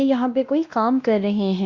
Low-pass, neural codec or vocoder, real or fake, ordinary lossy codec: 7.2 kHz; codec, 16 kHz, 1 kbps, X-Codec, WavLM features, trained on Multilingual LibriSpeech; fake; Opus, 64 kbps